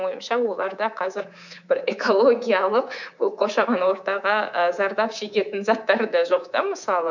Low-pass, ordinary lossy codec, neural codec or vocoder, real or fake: 7.2 kHz; none; codec, 24 kHz, 3.1 kbps, DualCodec; fake